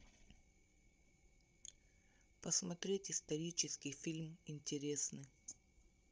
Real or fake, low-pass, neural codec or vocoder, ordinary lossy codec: fake; none; codec, 16 kHz, 16 kbps, FreqCodec, larger model; none